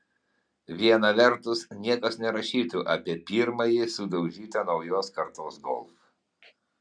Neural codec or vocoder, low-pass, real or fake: none; 9.9 kHz; real